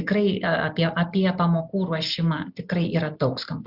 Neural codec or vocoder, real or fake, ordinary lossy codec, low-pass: none; real; Opus, 64 kbps; 5.4 kHz